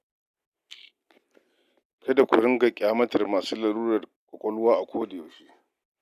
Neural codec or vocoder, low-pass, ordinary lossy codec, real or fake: none; 14.4 kHz; none; real